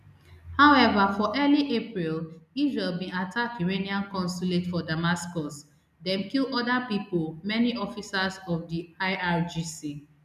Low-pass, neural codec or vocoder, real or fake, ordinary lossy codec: 14.4 kHz; none; real; none